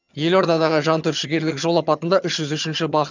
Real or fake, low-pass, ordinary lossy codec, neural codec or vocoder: fake; 7.2 kHz; none; vocoder, 22.05 kHz, 80 mel bands, HiFi-GAN